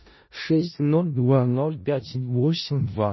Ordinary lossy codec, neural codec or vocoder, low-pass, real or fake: MP3, 24 kbps; codec, 16 kHz in and 24 kHz out, 0.4 kbps, LongCat-Audio-Codec, four codebook decoder; 7.2 kHz; fake